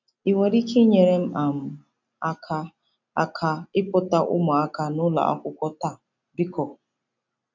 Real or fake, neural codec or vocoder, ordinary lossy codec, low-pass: real; none; none; 7.2 kHz